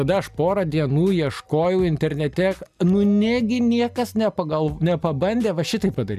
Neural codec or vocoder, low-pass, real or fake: none; 14.4 kHz; real